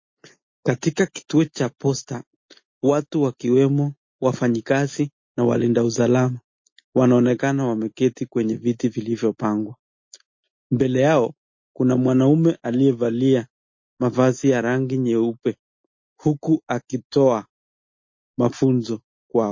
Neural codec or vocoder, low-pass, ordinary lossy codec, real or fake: none; 7.2 kHz; MP3, 32 kbps; real